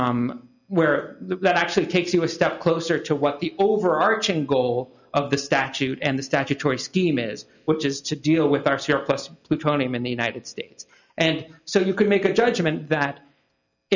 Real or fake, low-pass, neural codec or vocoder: real; 7.2 kHz; none